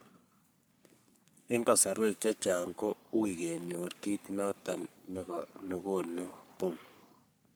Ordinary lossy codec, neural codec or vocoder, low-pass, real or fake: none; codec, 44.1 kHz, 3.4 kbps, Pupu-Codec; none; fake